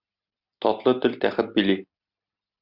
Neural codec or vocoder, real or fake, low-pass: none; real; 5.4 kHz